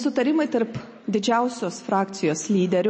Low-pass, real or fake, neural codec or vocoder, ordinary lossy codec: 9.9 kHz; real; none; MP3, 32 kbps